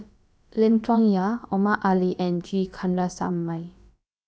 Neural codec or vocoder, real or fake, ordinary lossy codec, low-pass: codec, 16 kHz, about 1 kbps, DyCAST, with the encoder's durations; fake; none; none